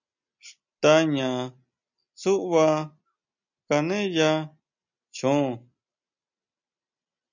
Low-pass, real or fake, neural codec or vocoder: 7.2 kHz; real; none